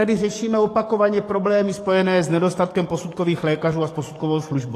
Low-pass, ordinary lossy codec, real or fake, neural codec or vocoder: 14.4 kHz; AAC, 48 kbps; fake; codec, 44.1 kHz, 7.8 kbps, DAC